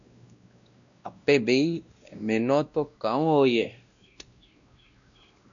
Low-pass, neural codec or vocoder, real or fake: 7.2 kHz; codec, 16 kHz, 1 kbps, X-Codec, WavLM features, trained on Multilingual LibriSpeech; fake